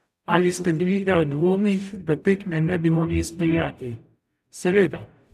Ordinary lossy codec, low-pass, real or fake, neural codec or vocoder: none; 14.4 kHz; fake; codec, 44.1 kHz, 0.9 kbps, DAC